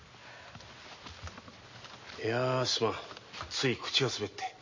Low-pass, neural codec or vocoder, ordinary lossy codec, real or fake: 7.2 kHz; none; MP3, 32 kbps; real